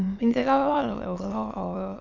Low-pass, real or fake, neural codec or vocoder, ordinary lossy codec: 7.2 kHz; fake; autoencoder, 22.05 kHz, a latent of 192 numbers a frame, VITS, trained on many speakers; none